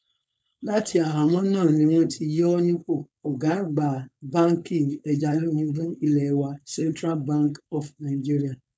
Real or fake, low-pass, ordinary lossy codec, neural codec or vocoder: fake; none; none; codec, 16 kHz, 4.8 kbps, FACodec